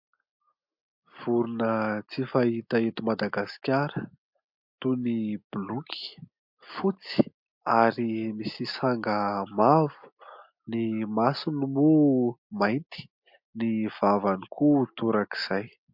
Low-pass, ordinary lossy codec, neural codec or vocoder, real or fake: 5.4 kHz; MP3, 48 kbps; none; real